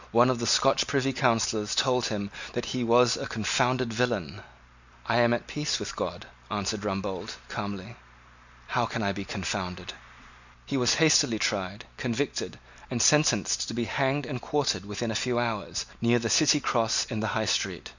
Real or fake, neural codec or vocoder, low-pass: real; none; 7.2 kHz